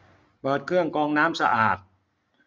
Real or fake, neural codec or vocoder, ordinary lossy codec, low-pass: real; none; none; none